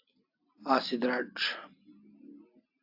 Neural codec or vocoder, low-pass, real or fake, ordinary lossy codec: none; 5.4 kHz; real; AAC, 48 kbps